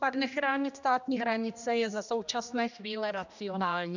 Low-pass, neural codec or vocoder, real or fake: 7.2 kHz; codec, 16 kHz, 1 kbps, X-Codec, HuBERT features, trained on general audio; fake